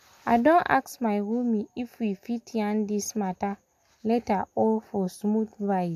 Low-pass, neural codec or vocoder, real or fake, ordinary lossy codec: 14.4 kHz; none; real; none